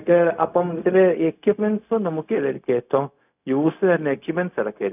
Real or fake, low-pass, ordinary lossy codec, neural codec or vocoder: fake; 3.6 kHz; none; codec, 16 kHz, 0.4 kbps, LongCat-Audio-Codec